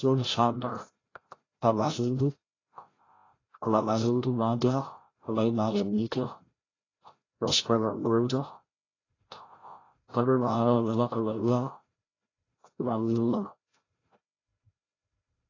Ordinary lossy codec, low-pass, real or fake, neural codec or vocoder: AAC, 32 kbps; 7.2 kHz; fake; codec, 16 kHz, 0.5 kbps, FreqCodec, larger model